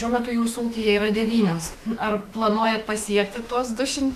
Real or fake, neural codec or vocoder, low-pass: fake; autoencoder, 48 kHz, 32 numbers a frame, DAC-VAE, trained on Japanese speech; 14.4 kHz